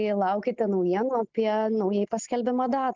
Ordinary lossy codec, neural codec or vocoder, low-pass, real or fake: Opus, 24 kbps; none; 7.2 kHz; real